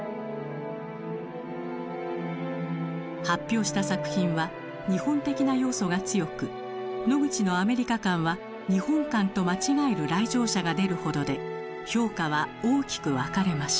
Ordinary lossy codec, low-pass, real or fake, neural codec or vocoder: none; none; real; none